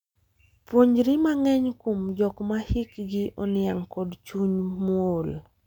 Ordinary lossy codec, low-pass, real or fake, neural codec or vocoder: none; 19.8 kHz; real; none